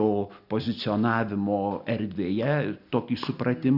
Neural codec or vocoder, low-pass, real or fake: none; 5.4 kHz; real